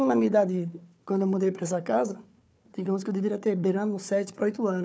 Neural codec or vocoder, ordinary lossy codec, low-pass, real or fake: codec, 16 kHz, 4 kbps, FunCodec, trained on Chinese and English, 50 frames a second; none; none; fake